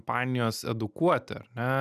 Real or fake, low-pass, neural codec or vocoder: real; 14.4 kHz; none